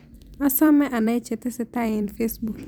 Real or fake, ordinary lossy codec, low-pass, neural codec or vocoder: fake; none; none; vocoder, 44.1 kHz, 128 mel bands every 256 samples, BigVGAN v2